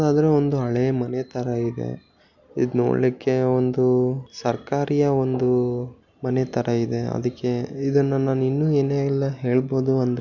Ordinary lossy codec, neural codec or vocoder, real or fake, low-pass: none; none; real; 7.2 kHz